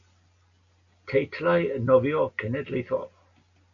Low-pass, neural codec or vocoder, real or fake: 7.2 kHz; none; real